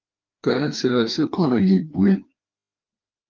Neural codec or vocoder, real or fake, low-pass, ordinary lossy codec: codec, 16 kHz, 2 kbps, FreqCodec, larger model; fake; 7.2 kHz; Opus, 32 kbps